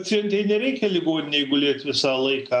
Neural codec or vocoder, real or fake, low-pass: none; real; 9.9 kHz